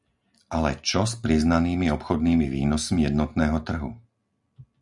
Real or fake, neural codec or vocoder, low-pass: real; none; 10.8 kHz